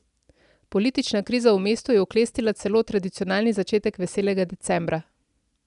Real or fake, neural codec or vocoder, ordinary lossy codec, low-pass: real; none; none; 10.8 kHz